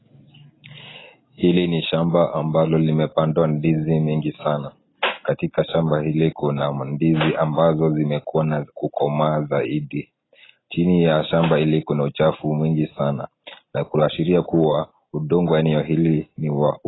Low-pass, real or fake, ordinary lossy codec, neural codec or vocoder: 7.2 kHz; real; AAC, 16 kbps; none